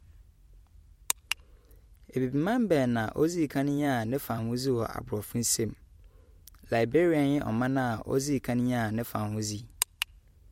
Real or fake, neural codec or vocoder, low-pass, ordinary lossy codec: fake; vocoder, 44.1 kHz, 128 mel bands every 256 samples, BigVGAN v2; 19.8 kHz; MP3, 64 kbps